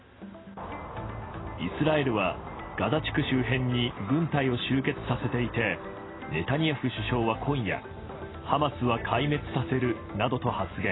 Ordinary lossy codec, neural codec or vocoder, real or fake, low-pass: AAC, 16 kbps; none; real; 7.2 kHz